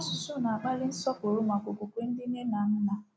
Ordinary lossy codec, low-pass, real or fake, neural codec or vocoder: none; none; real; none